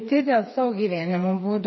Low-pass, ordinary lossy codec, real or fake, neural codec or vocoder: 7.2 kHz; MP3, 24 kbps; fake; codec, 16 kHz, 4 kbps, FreqCodec, smaller model